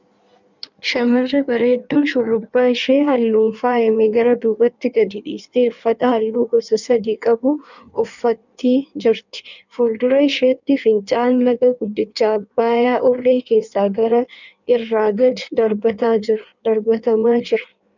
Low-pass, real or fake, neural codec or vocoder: 7.2 kHz; fake; codec, 16 kHz in and 24 kHz out, 1.1 kbps, FireRedTTS-2 codec